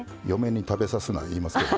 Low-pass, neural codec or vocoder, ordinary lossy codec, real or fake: none; none; none; real